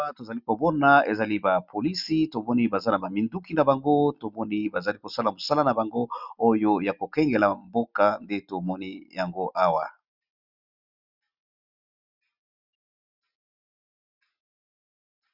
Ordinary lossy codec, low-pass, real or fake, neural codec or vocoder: Opus, 64 kbps; 5.4 kHz; real; none